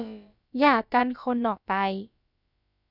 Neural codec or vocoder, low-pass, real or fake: codec, 16 kHz, about 1 kbps, DyCAST, with the encoder's durations; 5.4 kHz; fake